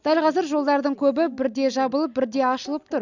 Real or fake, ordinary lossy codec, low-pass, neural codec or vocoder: real; none; 7.2 kHz; none